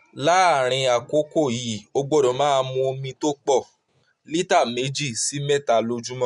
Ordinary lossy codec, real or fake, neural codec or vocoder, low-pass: MP3, 64 kbps; real; none; 10.8 kHz